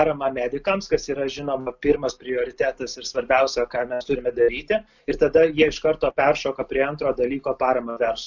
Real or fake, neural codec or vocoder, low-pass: real; none; 7.2 kHz